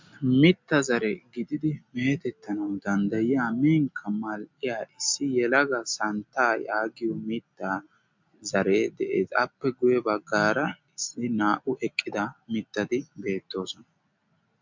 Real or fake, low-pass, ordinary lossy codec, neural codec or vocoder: real; 7.2 kHz; MP3, 64 kbps; none